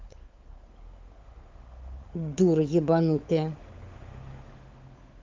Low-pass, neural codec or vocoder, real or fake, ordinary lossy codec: 7.2 kHz; codec, 16 kHz, 16 kbps, FunCodec, trained on LibriTTS, 50 frames a second; fake; Opus, 32 kbps